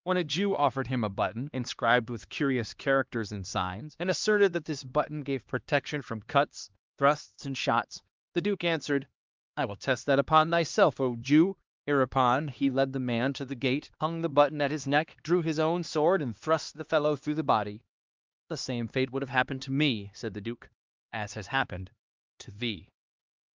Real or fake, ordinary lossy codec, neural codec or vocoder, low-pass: fake; Opus, 32 kbps; codec, 16 kHz, 2 kbps, X-Codec, HuBERT features, trained on LibriSpeech; 7.2 kHz